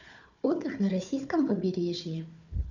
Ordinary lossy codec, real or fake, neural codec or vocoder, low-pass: none; fake; codec, 16 kHz, 4 kbps, FunCodec, trained on Chinese and English, 50 frames a second; 7.2 kHz